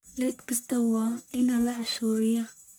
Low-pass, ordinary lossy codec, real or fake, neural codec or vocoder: none; none; fake; codec, 44.1 kHz, 1.7 kbps, Pupu-Codec